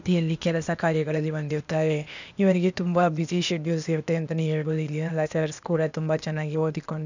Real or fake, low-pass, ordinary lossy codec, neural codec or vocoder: fake; 7.2 kHz; none; codec, 16 kHz, 0.8 kbps, ZipCodec